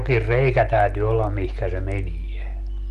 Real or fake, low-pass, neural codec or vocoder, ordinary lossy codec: real; 14.4 kHz; none; Opus, 32 kbps